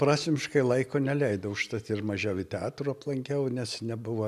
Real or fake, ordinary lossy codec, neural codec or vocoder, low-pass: real; MP3, 96 kbps; none; 14.4 kHz